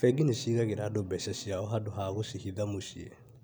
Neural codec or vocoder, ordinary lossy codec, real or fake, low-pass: none; none; real; none